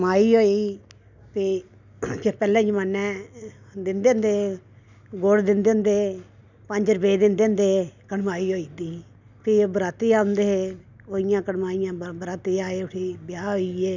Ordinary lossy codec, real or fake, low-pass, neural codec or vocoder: none; real; 7.2 kHz; none